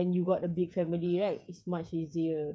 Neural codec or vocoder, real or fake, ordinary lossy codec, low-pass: codec, 16 kHz, 8 kbps, FreqCodec, smaller model; fake; none; none